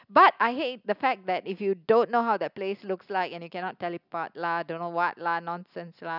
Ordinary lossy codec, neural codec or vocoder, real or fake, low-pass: none; none; real; 5.4 kHz